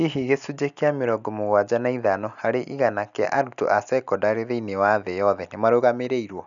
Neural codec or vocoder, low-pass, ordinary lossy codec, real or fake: none; 7.2 kHz; none; real